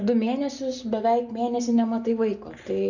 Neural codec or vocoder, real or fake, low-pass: none; real; 7.2 kHz